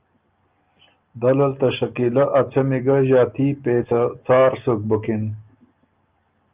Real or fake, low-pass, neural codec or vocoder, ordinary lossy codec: real; 3.6 kHz; none; Opus, 24 kbps